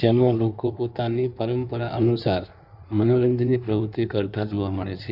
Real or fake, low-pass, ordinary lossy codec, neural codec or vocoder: fake; 5.4 kHz; none; codec, 16 kHz in and 24 kHz out, 1.1 kbps, FireRedTTS-2 codec